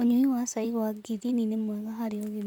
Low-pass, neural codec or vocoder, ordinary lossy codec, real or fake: 19.8 kHz; vocoder, 44.1 kHz, 128 mel bands, Pupu-Vocoder; none; fake